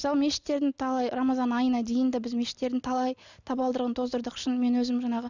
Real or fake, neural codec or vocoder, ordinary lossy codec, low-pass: real; none; none; 7.2 kHz